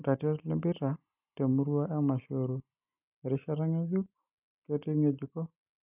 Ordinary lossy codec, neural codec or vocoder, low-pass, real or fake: none; none; 3.6 kHz; real